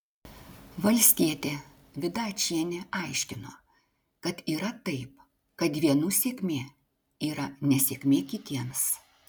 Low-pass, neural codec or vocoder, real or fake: 19.8 kHz; none; real